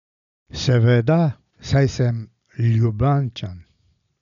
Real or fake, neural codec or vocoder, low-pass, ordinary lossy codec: real; none; 7.2 kHz; none